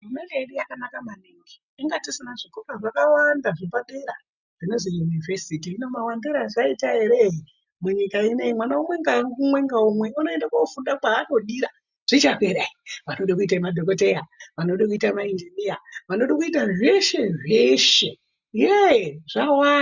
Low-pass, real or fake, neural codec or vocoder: 7.2 kHz; real; none